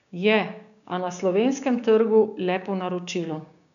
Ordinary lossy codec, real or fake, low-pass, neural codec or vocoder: none; fake; 7.2 kHz; codec, 16 kHz, 6 kbps, DAC